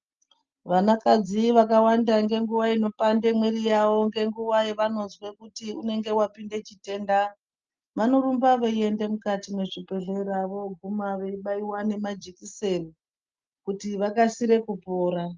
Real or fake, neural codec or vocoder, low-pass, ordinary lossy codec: real; none; 7.2 kHz; Opus, 24 kbps